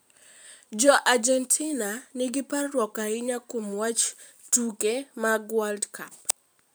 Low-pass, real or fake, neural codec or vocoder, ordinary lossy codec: none; fake; vocoder, 44.1 kHz, 128 mel bands every 512 samples, BigVGAN v2; none